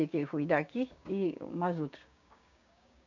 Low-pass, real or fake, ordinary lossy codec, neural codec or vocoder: 7.2 kHz; fake; none; codec, 16 kHz, 6 kbps, DAC